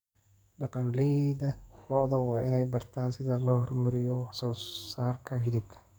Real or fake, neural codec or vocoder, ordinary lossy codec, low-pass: fake; codec, 44.1 kHz, 2.6 kbps, SNAC; none; none